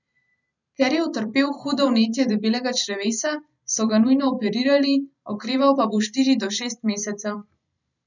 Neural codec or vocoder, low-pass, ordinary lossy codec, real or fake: vocoder, 44.1 kHz, 128 mel bands every 512 samples, BigVGAN v2; 7.2 kHz; none; fake